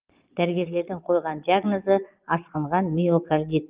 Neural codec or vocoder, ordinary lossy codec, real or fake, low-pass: vocoder, 22.05 kHz, 80 mel bands, Vocos; Opus, 32 kbps; fake; 3.6 kHz